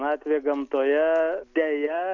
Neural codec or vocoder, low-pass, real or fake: none; 7.2 kHz; real